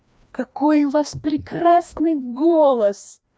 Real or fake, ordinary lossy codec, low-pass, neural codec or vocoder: fake; none; none; codec, 16 kHz, 1 kbps, FreqCodec, larger model